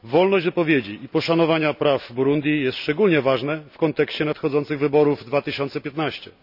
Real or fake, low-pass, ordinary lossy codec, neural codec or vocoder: real; 5.4 kHz; none; none